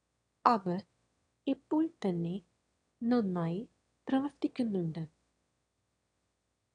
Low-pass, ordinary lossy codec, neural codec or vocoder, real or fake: 9.9 kHz; none; autoencoder, 22.05 kHz, a latent of 192 numbers a frame, VITS, trained on one speaker; fake